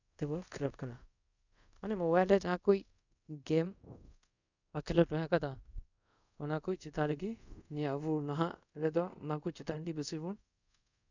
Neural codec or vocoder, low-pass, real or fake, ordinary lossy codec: codec, 24 kHz, 0.5 kbps, DualCodec; 7.2 kHz; fake; none